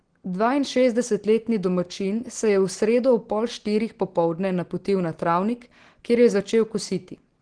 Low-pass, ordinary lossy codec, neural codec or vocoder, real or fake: 9.9 kHz; Opus, 16 kbps; none; real